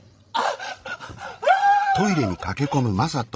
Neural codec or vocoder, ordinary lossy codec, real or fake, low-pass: codec, 16 kHz, 16 kbps, FreqCodec, larger model; none; fake; none